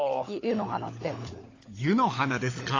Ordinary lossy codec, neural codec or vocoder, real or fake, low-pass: AAC, 32 kbps; codec, 16 kHz, 16 kbps, FunCodec, trained on LibriTTS, 50 frames a second; fake; 7.2 kHz